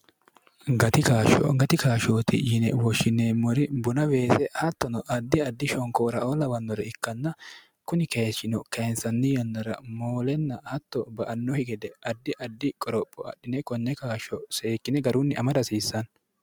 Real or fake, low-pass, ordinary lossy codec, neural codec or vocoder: real; 19.8 kHz; MP3, 96 kbps; none